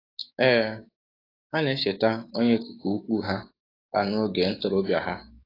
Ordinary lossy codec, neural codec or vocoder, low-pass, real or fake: AAC, 24 kbps; codec, 16 kHz, 6 kbps, DAC; 5.4 kHz; fake